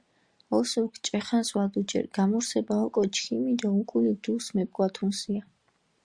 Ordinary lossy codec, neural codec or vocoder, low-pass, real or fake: Opus, 64 kbps; none; 9.9 kHz; real